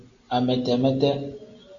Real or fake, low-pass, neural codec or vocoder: real; 7.2 kHz; none